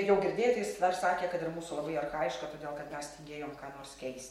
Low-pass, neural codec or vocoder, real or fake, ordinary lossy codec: 19.8 kHz; none; real; MP3, 64 kbps